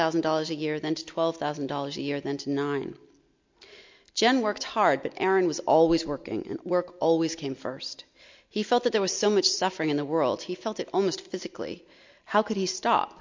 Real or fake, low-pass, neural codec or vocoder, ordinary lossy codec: real; 7.2 kHz; none; MP3, 48 kbps